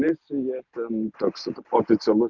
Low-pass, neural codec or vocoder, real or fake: 7.2 kHz; none; real